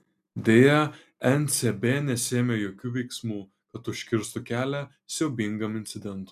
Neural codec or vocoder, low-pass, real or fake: none; 14.4 kHz; real